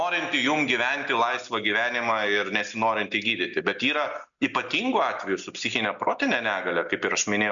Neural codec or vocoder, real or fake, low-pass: none; real; 7.2 kHz